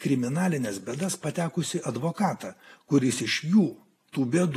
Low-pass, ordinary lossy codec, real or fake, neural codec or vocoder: 14.4 kHz; AAC, 48 kbps; real; none